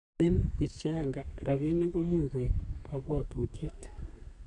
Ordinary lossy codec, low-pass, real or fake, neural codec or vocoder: none; 10.8 kHz; fake; codec, 32 kHz, 1.9 kbps, SNAC